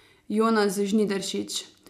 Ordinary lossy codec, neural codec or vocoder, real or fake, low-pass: none; none; real; 14.4 kHz